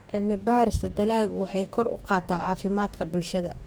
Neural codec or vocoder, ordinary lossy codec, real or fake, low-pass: codec, 44.1 kHz, 2.6 kbps, DAC; none; fake; none